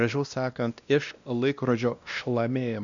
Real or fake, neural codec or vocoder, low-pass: fake; codec, 16 kHz, 1 kbps, X-Codec, WavLM features, trained on Multilingual LibriSpeech; 7.2 kHz